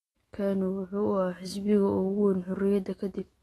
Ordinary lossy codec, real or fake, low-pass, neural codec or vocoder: AAC, 32 kbps; fake; 19.8 kHz; autoencoder, 48 kHz, 128 numbers a frame, DAC-VAE, trained on Japanese speech